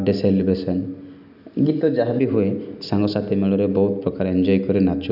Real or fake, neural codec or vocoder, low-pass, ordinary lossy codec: real; none; 5.4 kHz; none